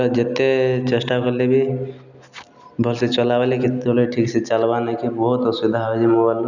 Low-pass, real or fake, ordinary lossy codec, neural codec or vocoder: 7.2 kHz; real; none; none